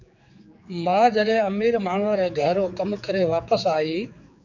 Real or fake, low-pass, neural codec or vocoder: fake; 7.2 kHz; codec, 16 kHz, 4 kbps, X-Codec, HuBERT features, trained on general audio